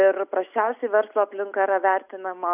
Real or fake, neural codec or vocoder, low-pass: real; none; 3.6 kHz